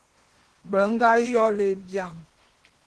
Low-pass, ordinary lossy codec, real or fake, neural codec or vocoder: 10.8 kHz; Opus, 16 kbps; fake; codec, 16 kHz in and 24 kHz out, 0.8 kbps, FocalCodec, streaming, 65536 codes